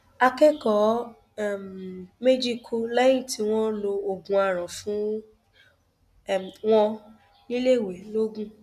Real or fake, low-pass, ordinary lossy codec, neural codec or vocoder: real; 14.4 kHz; none; none